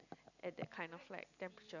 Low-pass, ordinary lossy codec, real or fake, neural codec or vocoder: 7.2 kHz; none; real; none